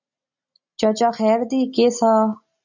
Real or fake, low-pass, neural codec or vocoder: real; 7.2 kHz; none